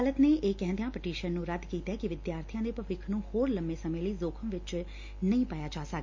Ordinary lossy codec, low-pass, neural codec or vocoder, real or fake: MP3, 48 kbps; 7.2 kHz; none; real